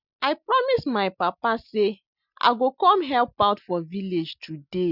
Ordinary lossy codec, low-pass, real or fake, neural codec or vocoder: none; 5.4 kHz; real; none